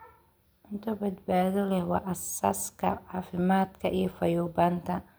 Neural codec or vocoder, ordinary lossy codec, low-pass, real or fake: none; none; none; real